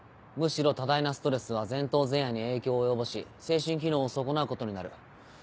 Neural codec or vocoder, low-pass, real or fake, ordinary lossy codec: none; none; real; none